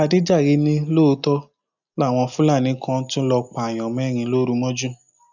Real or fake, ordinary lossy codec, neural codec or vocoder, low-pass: real; none; none; 7.2 kHz